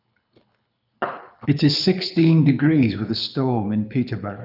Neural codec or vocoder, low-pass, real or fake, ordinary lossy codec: codec, 24 kHz, 6 kbps, HILCodec; 5.4 kHz; fake; none